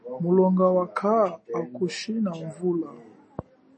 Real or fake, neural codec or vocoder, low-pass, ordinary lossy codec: real; none; 10.8 kHz; MP3, 32 kbps